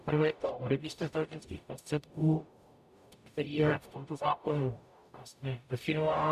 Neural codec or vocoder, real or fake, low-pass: codec, 44.1 kHz, 0.9 kbps, DAC; fake; 14.4 kHz